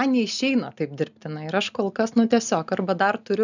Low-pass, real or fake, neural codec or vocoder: 7.2 kHz; real; none